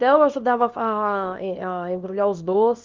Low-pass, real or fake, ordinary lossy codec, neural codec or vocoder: 7.2 kHz; fake; Opus, 32 kbps; codec, 24 kHz, 0.9 kbps, WavTokenizer, small release